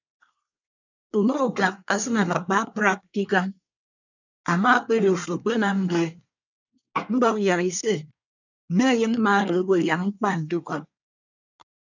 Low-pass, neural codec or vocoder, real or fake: 7.2 kHz; codec, 24 kHz, 1 kbps, SNAC; fake